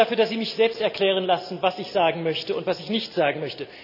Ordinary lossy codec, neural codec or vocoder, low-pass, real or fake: none; none; 5.4 kHz; real